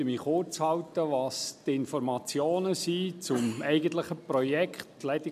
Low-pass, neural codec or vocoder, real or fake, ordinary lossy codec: 14.4 kHz; none; real; none